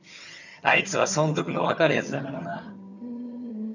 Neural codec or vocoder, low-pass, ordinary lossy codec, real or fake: vocoder, 22.05 kHz, 80 mel bands, HiFi-GAN; 7.2 kHz; none; fake